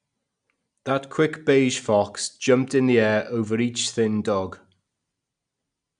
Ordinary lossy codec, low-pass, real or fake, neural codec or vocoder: none; 9.9 kHz; real; none